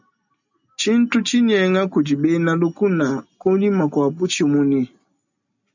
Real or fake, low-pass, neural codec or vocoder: real; 7.2 kHz; none